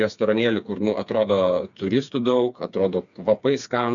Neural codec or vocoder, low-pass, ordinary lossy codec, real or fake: codec, 16 kHz, 4 kbps, FreqCodec, smaller model; 7.2 kHz; MP3, 96 kbps; fake